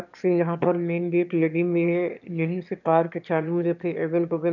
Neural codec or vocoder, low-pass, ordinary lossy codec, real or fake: autoencoder, 22.05 kHz, a latent of 192 numbers a frame, VITS, trained on one speaker; 7.2 kHz; none; fake